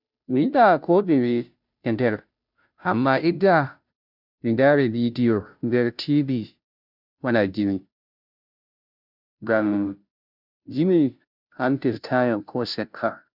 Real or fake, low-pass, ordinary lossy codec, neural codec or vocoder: fake; 5.4 kHz; none; codec, 16 kHz, 0.5 kbps, FunCodec, trained on Chinese and English, 25 frames a second